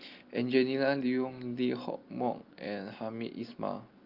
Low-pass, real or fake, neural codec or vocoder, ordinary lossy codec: 5.4 kHz; real; none; Opus, 32 kbps